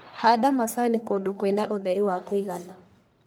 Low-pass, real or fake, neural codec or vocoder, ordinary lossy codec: none; fake; codec, 44.1 kHz, 1.7 kbps, Pupu-Codec; none